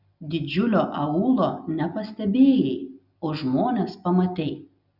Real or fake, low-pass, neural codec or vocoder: real; 5.4 kHz; none